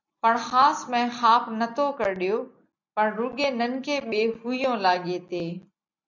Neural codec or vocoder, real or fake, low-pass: none; real; 7.2 kHz